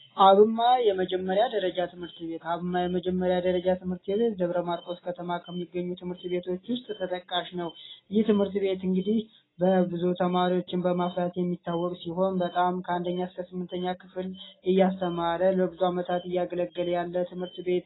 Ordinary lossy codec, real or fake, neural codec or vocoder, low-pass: AAC, 16 kbps; real; none; 7.2 kHz